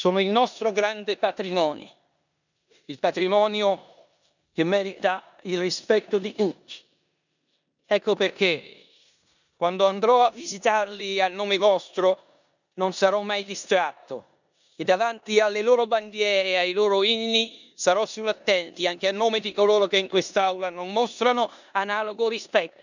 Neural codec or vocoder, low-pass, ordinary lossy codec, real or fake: codec, 16 kHz in and 24 kHz out, 0.9 kbps, LongCat-Audio-Codec, four codebook decoder; 7.2 kHz; none; fake